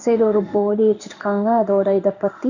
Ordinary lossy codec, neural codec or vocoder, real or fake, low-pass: none; codec, 16 kHz in and 24 kHz out, 1 kbps, XY-Tokenizer; fake; 7.2 kHz